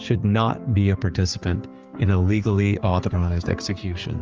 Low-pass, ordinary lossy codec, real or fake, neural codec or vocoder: 7.2 kHz; Opus, 24 kbps; fake; codec, 16 kHz, 4 kbps, X-Codec, HuBERT features, trained on general audio